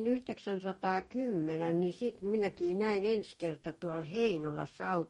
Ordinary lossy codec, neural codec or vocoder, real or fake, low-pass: MP3, 48 kbps; codec, 44.1 kHz, 2.6 kbps, DAC; fake; 19.8 kHz